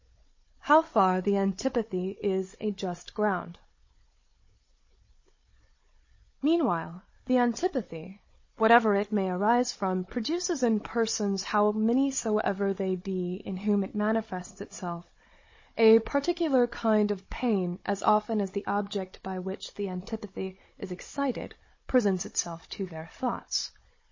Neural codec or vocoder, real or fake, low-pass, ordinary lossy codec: codec, 16 kHz, 16 kbps, FunCodec, trained on Chinese and English, 50 frames a second; fake; 7.2 kHz; MP3, 32 kbps